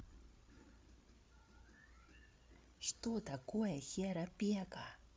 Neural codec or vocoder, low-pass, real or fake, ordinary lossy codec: codec, 16 kHz, 8 kbps, FreqCodec, larger model; none; fake; none